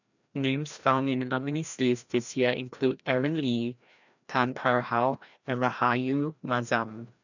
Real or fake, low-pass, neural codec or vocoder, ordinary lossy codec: fake; 7.2 kHz; codec, 16 kHz, 1 kbps, FreqCodec, larger model; none